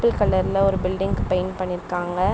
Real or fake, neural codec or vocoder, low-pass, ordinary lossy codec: real; none; none; none